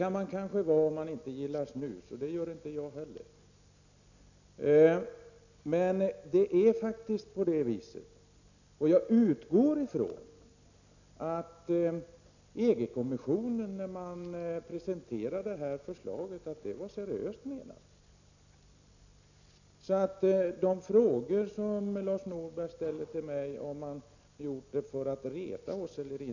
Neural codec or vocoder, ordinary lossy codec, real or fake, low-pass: none; none; real; 7.2 kHz